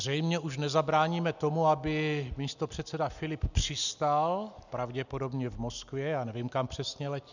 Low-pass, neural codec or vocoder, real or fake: 7.2 kHz; none; real